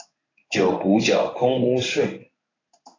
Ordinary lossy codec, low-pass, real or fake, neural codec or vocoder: AAC, 32 kbps; 7.2 kHz; fake; codec, 16 kHz in and 24 kHz out, 1 kbps, XY-Tokenizer